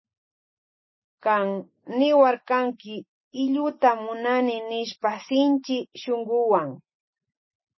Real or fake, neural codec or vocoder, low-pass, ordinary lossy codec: real; none; 7.2 kHz; MP3, 24 kbps